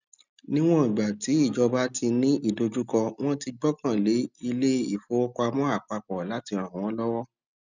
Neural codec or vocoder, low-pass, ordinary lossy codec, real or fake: none; 7.2 kHz; none; real